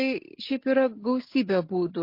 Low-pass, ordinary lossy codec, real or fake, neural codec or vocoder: 5.4 kHz; MP3, 32 kbps; real; none